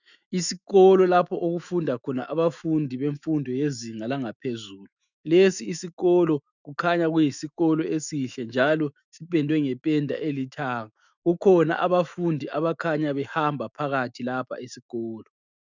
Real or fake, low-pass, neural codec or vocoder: fake; 7.2 kHz; autoencoder, 48 kHz, 128 numbers a frame, DAC-VAE, trained on Japanese speech